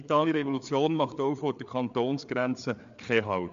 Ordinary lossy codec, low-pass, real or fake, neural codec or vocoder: MP3, 64 kbps; 7.2 kHz; fake; codec, 16 kHz, 4 kbps, FreqCodec, larger model